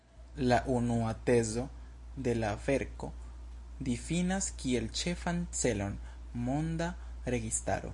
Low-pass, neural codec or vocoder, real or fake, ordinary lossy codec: 10.8 kHz; none; real; MP3, 48 kbps